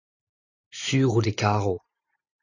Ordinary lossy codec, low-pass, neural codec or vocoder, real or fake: AAC, 48 kbps; 7.2 kHz; none; real